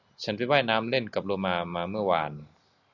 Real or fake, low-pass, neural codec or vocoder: real; 7.2 kHz; none